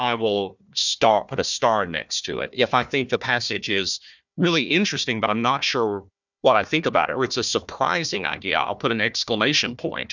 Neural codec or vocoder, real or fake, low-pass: codec, 16 kHz, 1 kbps, FunCodec, trained on Chinese and English, 50 frames a second; fake; 7.2 kHz